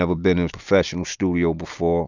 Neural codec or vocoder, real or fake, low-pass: none; real; 7.2 kHz